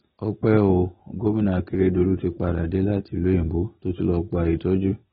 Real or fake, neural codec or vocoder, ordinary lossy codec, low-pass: real; none; AAC, 16 kbps; 7.2 kHz